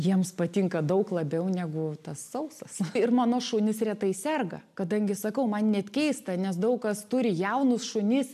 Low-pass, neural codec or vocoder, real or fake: 14.4 kHz; none; real